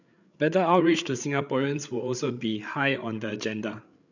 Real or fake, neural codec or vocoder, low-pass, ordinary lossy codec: fake; codec, 16 kHz, 8 kbps, FreqCodec, larger model; 7.2 kHz; none